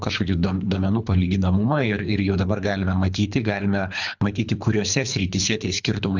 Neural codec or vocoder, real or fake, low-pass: codec, 24 kHz, 3 kbps, HILCodec; fake; 7.2 kHz